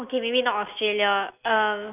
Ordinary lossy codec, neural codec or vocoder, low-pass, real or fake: none; none; 3.6 kHz; real